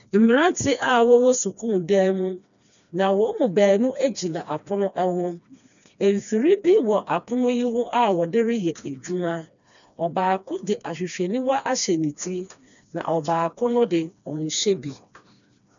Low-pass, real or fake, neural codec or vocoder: 7.2 kHz; fake; codec, 16 kHz, 2 kbps, FreqCodec, smaller model